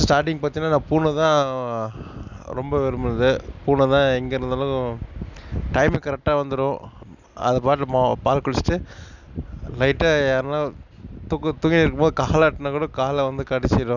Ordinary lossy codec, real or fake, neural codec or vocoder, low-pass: none; real; none; 7.2 kHz